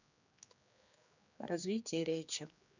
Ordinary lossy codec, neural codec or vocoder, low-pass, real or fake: none; codec, 16 kHz, 2 kbps, X-Codec, HuBERT features, trained on general audio; 7.2 kHz; fake